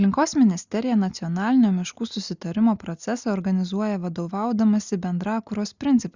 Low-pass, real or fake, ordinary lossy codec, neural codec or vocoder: 7.2 kHz; real; Opus, 64 kbps; none